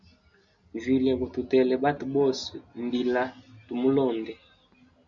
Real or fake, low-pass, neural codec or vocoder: real; 7.2 kHz; none